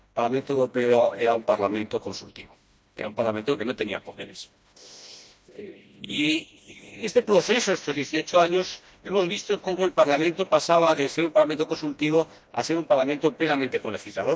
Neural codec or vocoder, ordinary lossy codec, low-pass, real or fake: codec, 16 kHz, 1 kbps, FreqCodec, smaller model; none; none; fake